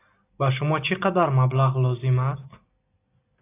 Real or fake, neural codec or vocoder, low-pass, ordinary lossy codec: real; none; 3.6 kHz; AAC, 24 kbps